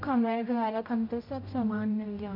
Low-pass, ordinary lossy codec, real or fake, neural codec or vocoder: 5.4 kHz; MP3, 32 kbps; fake; codec, 16 kHz, 0.5 kbps, X-Codec, HuBERT features, trained on general audio